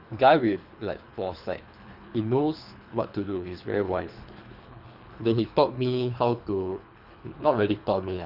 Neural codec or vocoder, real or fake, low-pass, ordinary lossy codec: codec, 24 kHz, 3 kbps, HILCodec; fake; 5.4 kHz; none